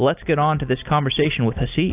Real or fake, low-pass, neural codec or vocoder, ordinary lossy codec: real; 3.6 kHz; none; AAC, 32 kbps